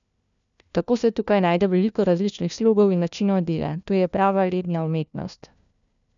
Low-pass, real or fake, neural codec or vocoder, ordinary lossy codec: 7.2 kHz; fake; codec, 16 kHz, 1 kbps, FunCodec, trained on LibriTTS, 50 frames a second; none